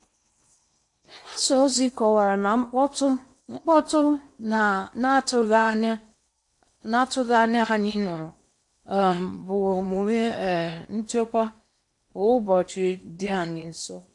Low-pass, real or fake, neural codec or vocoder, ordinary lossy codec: 10.8 kHz; fake; codec, 16 kHz in and 24 kHz out, 0.8 kbps, FocalCodec, streaming, 65536 codes; AAC, 64 kbps